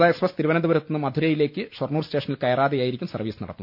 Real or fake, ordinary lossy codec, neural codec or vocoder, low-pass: real; none; none; 5.4 kHz